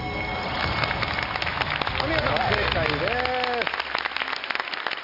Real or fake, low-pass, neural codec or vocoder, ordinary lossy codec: real; 5.4 kHz; none; none